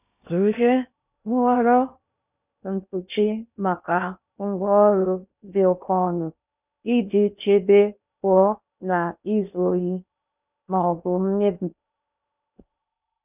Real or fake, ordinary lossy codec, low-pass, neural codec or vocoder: fake; none; 3.6 kHz; codec, 16 kHz in and 24 kHz out, 0.6 kbps, FocalCodec, streaming, 2048 codes